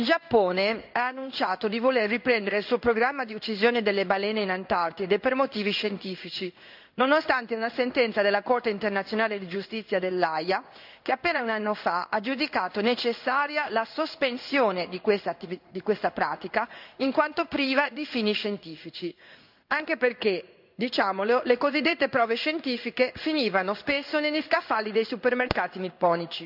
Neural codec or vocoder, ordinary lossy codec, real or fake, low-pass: codec, 16 kHz in and 24 kHz out, 1 kbps, XY-Tokenizer; none; fake; 5.4 kHz